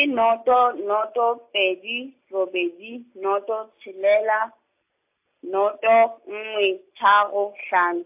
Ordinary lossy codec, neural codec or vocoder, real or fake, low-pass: AAC, 32 kbps; none; real; 3.6 kHz